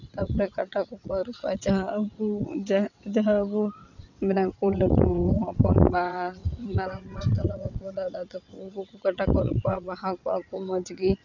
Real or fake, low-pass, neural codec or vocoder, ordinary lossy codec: fake; 7.2 kHz; vocoder, 22.05 kHz, 80 mel bands, WaveNeXt; none